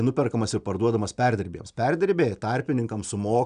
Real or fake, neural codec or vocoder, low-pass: real; none; 9.9 kHz